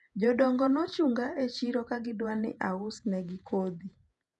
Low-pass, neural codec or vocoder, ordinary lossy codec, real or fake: 10.8 kHz; vocoder, 48 kHz, 128 mel bands, Vocos; none; fake